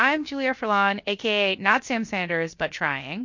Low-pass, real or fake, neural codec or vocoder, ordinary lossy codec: 7.2 kHz; fake; codec, 16 kHz, 0.7 kbps, FocalCodec; MP3, 48 kbps